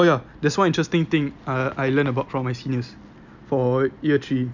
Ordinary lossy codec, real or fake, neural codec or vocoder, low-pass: none; real; none; 7.2 kHz